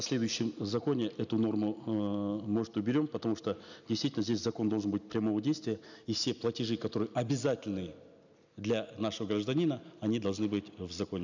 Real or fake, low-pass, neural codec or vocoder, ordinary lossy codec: real; 7.2 kHz; none; none